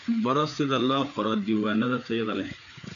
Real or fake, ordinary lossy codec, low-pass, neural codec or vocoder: fake; none; 7.2 kHz; codec, 16 kHz, 4 kbps, FreqCodec, larger model